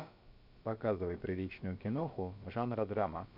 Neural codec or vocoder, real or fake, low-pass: codec, 16 kHz, about 1 kbps, DyCAST, with the encoder's durations; fake; 5.4 kHz